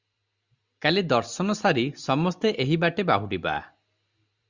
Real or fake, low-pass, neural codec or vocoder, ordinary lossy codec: real; 7.2 kHz; none; Opus, 64 kbps